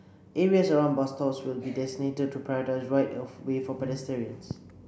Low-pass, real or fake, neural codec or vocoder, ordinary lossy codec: none; real; none; none